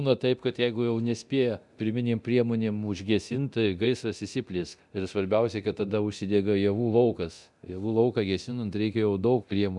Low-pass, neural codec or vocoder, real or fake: 10.8 kHz; codec, 24 kHz, 0.9 kbps, DualCodec; fake